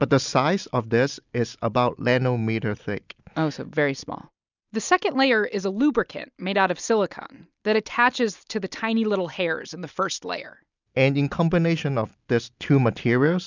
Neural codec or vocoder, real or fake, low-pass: none; real; 7.2 kHz